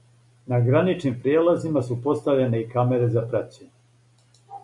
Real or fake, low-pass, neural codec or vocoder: real; 10.8 kHz; none